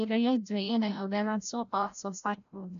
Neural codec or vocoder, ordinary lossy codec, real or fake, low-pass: codec, 16 kHz, 0.5 kbps, FreqCodec, larger model; none; fake; 7.2 kHz